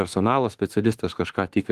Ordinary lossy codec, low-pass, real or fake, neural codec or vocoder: Opus, 24 kbps; 14.4 kHz; fake; autoencoder, 48 kHz, 32 numbers a frame, DAC-VAE, trained on Japanese speech